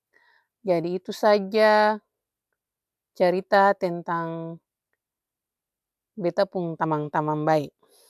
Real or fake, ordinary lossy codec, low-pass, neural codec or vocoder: real; none; 14.4 kHz; none